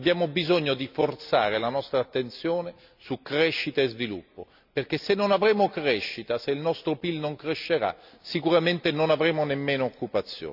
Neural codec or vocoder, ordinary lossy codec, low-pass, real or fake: none; none; 5.4 kHz; real